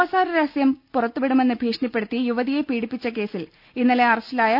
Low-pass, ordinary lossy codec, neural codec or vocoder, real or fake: 5.4 kHz; none; none; real